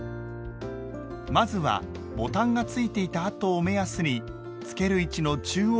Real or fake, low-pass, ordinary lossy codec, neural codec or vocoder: real; none; none; none